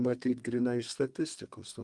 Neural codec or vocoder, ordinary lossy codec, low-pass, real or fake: codec, 32 kHz, 1.9 kbps, SNAC; Opus, 24 kbps; 10.8 kHz; fake